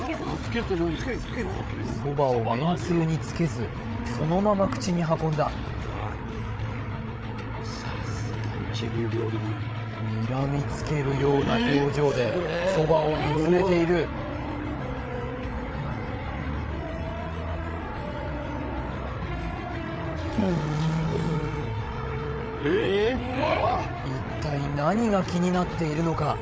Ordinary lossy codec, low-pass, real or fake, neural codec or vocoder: none; none; fake; codec, 16 kHz, 8 kbps, FreqCodec, larger model